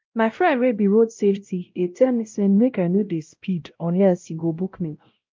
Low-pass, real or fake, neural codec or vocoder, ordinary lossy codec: 7.2 kHz; fake; codec, 16 kHz, 0.5 kbps, X-Codec, WavLM features, trained on Multilingual LibriSpeech; Opus, 32 kbps